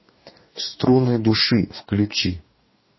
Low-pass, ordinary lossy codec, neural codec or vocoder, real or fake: 7.2 kHz; MP3, 24 kbps; codec, 44.1 kHz, 2.6 kbps, DAC; fake